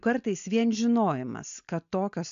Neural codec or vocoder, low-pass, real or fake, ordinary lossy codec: none; 7.2 kHz; real; AAC, 64 kbps